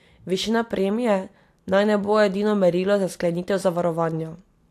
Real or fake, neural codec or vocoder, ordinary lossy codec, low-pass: real; none; AAC, 64 kbps; 14.4 kHz